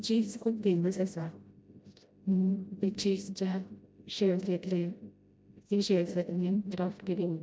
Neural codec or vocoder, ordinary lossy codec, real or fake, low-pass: codec, 16 kHz, 0.5 kbps, FreqCodec, smaller model; none; fake; none